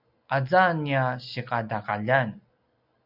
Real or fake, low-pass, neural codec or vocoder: real; 5.4 kHz; none